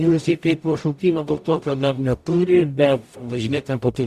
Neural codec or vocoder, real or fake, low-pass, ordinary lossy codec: codec, 44.1 kHz, 0.9 kbps, DAC; fake; 14.4 kHz; Opus, 64 kbps